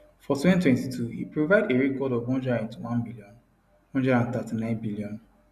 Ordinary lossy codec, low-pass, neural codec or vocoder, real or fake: none; 14.4 kHz; none; real